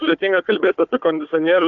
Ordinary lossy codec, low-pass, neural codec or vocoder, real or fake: AAC, 64 kbps; 7.2 kHz; codec, 16 kHz, 4 kbps, FunCodec, trained on Chinese and English, 50 frames a second; fake